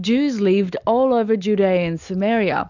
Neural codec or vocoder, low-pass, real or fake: none; 7.2 kHz; real